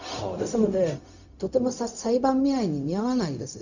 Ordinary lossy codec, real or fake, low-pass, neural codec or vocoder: none; fake; 7.2 kHz; codec, 16 kHz, 0.4 kbps, LongCat-Audio-Codec